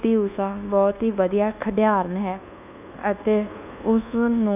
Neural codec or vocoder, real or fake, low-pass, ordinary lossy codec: codec, 24 kHz, 1.2 kbps, DualCodec; fake; 3.6 kHz; none